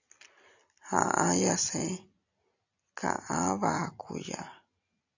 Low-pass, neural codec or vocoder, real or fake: 7.2 kHz; none; real